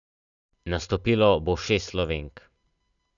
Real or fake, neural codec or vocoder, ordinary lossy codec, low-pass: real; none; none; 7.2 kHz